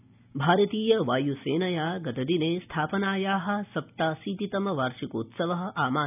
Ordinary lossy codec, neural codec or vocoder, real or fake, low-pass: none; none; real; 3.6 kHz